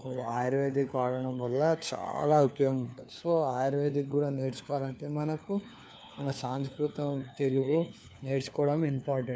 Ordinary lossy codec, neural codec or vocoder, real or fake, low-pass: none; codec, 16 kHz, 4 kbps, FunCodec, trained on LibriTTS, 50 frames a second; fake; none